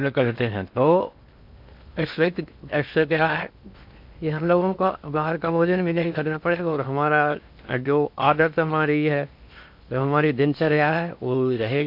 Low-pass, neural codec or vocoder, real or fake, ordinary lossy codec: 5.4 kHz; codec, 16 kHz in and 24 kHz out, 0.6 kbps, FocalCodec, streaming, 4096 codes; fake; MP3, 48 kbps